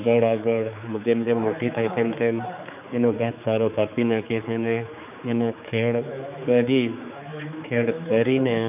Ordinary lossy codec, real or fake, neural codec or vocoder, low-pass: none; fake; codec, 16 kHz, 4 kbps, X-Codec, HuBERT features, trained on general audio; 3.6 kHz